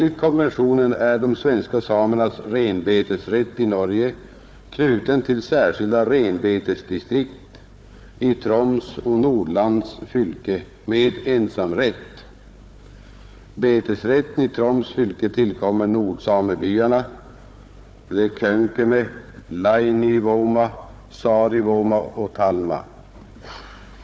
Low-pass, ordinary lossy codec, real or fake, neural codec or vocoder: none; none; fake; codec, 16 kHz, 4 kbps, FunCodec, trained on Chinese and English, 50 frames a second